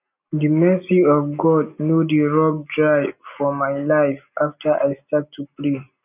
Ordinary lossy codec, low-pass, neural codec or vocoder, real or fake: none; 3.6 kHz; none; real